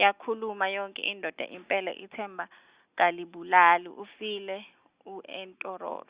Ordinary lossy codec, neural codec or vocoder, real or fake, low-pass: Opus, 64 kbps; none; real; 3.6 kHz